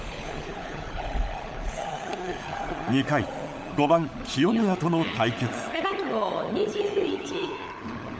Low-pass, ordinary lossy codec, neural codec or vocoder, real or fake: none; none; codec, 16 kHz, 16 kbps, FunCodec, trained on LibriTTS, 50 frames a second; fake